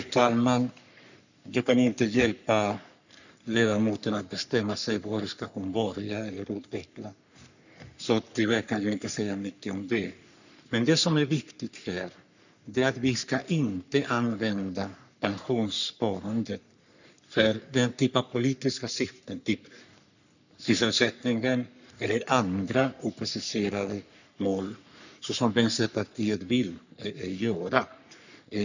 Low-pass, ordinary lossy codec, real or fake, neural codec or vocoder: 7.2 kHz; none; fake; codec, 44.1 kHz, 3.4 kbps, Pupu-Codec